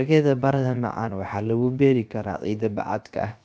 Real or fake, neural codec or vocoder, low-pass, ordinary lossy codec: fake; codec, 16 kHz, 0.7 kbps, FocalCodec; none; none